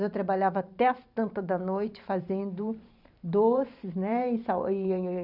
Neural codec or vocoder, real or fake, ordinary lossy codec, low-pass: vocoder, 44.1 kHz, 128 mel bands every 512 samples, BigVGAN v2; fake; none; 5.4 kHz